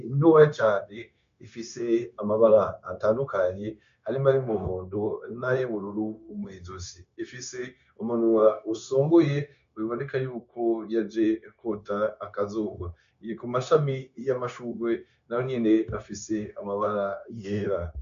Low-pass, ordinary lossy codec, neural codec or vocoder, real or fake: 7.2 kHz; MP3, 64 kbps; codec, 16 kHz, 0.9 kbps, LongCat-Audio-Codec; fake